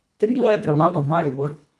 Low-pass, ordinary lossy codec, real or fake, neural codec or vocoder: none; none; fake; codec, 24 kHz, 1.5 kbps, HILCodec